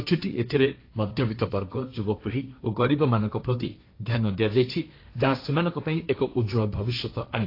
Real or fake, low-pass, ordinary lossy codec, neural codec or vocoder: fake; 5.4 kHz; AAC, 32 kbps; codec, 16 kHz, 1.1 kbps, Voila-Tokenizer